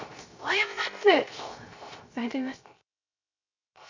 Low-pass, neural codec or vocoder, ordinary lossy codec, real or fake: 7.2 kHz; codec, 16 kHz, 0.3 kbps, FocalCodec; MP3, 64 kbps; fake